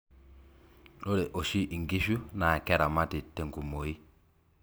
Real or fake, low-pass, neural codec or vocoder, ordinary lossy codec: real; none; none; none